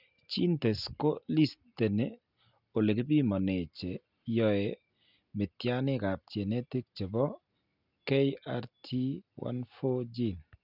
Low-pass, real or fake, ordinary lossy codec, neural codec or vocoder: 5.4 kHz; real; none; none